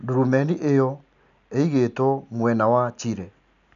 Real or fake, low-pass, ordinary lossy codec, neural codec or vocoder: real; 7.2 kHz; none; none